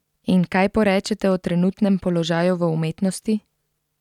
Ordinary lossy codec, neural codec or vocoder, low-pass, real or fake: none; none; 19.8 kHz; real